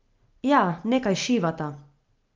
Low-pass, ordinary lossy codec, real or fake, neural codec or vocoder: 7.2 kHz; Opus, 24 kbps; real; none